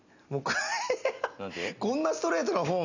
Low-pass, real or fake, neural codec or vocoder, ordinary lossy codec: 7.2 kHz; real; none; none